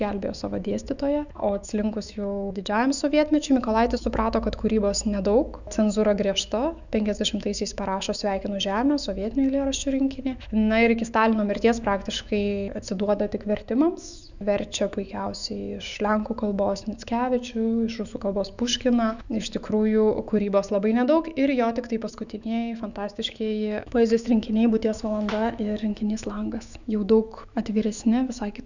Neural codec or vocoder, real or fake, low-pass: autoencoder, 48 kHz, 128 numbers a frame, DAC-VAE, trained on Japanese speech; fake; 7.2 kHz